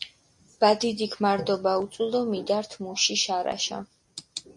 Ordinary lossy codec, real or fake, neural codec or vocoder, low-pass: MP3, 48 kbps; real; none; 10.8 kHz